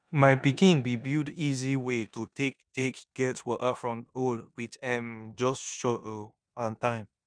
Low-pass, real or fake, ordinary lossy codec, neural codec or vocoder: 9.9 kHz; fake; none; codec, 16 kHz in and 24 kHz out, 0.9 kbps, LongCat-Audio-Codec, four codebook decoder